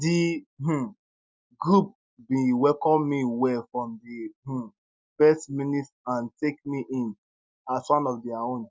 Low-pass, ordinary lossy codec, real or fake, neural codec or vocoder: none; none; real; none